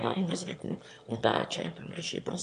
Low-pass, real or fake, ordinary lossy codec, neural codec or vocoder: 9.9 kHz; fake; MP3, 64 kbps; autoencoder, 22.05 kHz, a latent of 192 numbers a frame, VITS, trained on one speaker